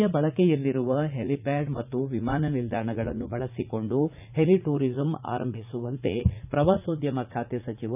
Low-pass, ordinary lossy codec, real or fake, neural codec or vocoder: 3.6 kHz; none; fake; vocoder, 44.1 kHz, 80 mel bands, Vocos